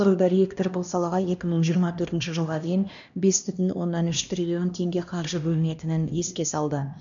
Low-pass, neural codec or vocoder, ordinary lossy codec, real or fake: 7.2 kHz; codec, 16 kHz, 1 kbps, X-Codec, HuBERT features, trained on LibriSpeech; none; fake